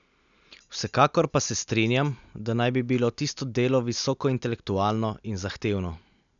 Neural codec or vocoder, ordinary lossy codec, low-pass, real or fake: none; none; 7.2 kHz; real